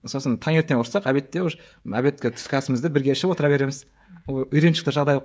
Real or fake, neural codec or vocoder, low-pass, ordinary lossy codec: fake; codec, 16 kHz, 16 kbps, FreqCodec, smaller model; none; none